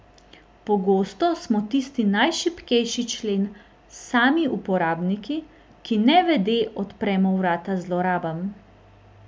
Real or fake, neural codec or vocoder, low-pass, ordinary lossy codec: real; none; none; none